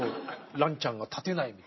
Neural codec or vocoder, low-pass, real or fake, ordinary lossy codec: none; 7.2 kHz; real; MP3, 24 kbps